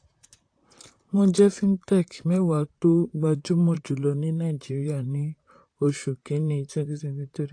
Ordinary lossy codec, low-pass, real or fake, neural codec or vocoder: AAC, 48 kbps; 9.9 kHz; fake; vocoder, 44.1 kHz, 128 mel bands, Pupu-Vocoder